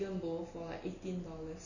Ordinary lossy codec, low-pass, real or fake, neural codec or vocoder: none; 7.2 kHz; real; none